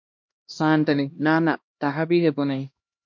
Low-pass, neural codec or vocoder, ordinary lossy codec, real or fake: 7.2 kHz; codec, 16 kHz, 1 kbps, X-Codec, HuBERT features, trained on LibriSpeech; MP3, 48 kbps; fake